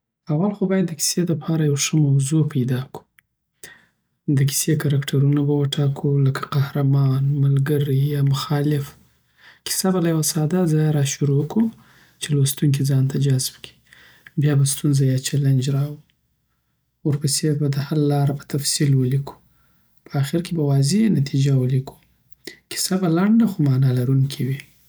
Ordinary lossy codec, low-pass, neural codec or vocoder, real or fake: none; none; none; real